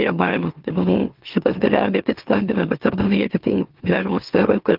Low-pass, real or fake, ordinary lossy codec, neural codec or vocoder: 5.4 kHz; fake; Opus, 32 kbps; autoencoder, 44.1 kHz, a latent of 192 numbers a frame, MeloTTS